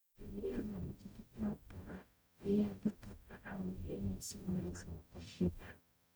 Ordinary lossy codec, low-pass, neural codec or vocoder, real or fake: none; none; codec, 44.1 kHz, 0.9 kbps, DAC; fake